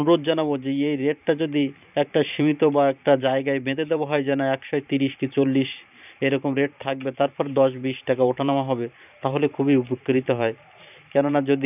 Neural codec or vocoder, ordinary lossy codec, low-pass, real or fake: vocoder, 44.1 kHz, 128 mel bands every 512 samples, BigVGAN v2; none; 3.6 kHz; fake